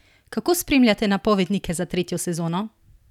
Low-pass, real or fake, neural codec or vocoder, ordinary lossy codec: 19.8 kHz; real; none; none